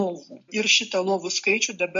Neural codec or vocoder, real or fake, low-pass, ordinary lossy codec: none; real; 7.2 kHz; MP3, 48 kbps